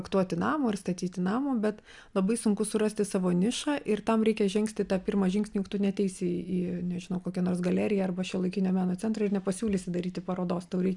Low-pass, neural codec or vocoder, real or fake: 10.8 kHz; none; real